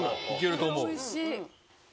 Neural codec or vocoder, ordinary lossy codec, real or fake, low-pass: none; none; real; none